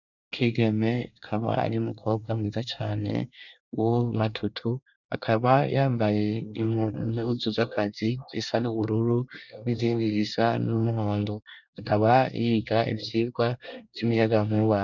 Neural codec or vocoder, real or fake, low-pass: codec, 24 kHz, 1 kbps, SNAC; fake; 7.2 kHz